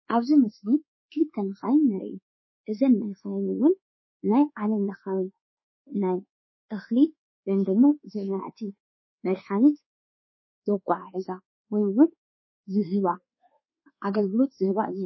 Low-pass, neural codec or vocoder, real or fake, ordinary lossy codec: 7.2 kHz; codec, 24 kHz, 1.2 kbps, DualCodec; fake; MP3, 24 kbps